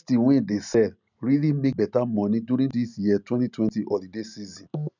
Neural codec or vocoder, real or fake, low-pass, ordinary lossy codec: none; real; 7.2 kHz; none